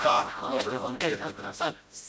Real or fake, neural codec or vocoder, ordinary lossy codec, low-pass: fake; codec, 16 kHz, 0.5 kbps, FreqCodec, smaller model; none; none